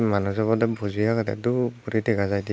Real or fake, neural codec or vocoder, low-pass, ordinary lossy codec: real; none; none; none